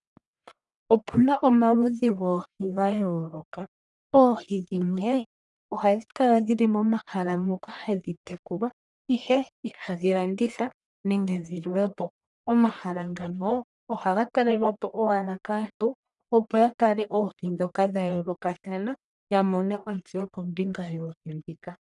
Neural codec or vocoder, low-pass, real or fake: codec, 44.1 kHz, 1.7 kbps, Pupu-Codec; 10.8 kHz; fake